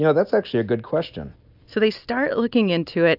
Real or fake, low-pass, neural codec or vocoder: real; 5.4 kHz; none